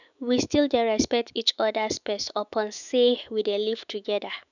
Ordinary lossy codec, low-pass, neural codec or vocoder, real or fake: none; 7.2 kHz; autoencoder, 48 kHz, 128 numbers a frame, DAC-VAE, trained on Japanese speech; fake